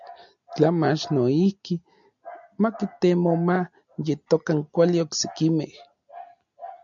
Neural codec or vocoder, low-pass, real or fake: none; 7.2 kHz; real